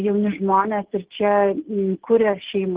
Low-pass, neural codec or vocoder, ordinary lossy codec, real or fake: 3.6 kHz; none; Opus, 16 kbps; real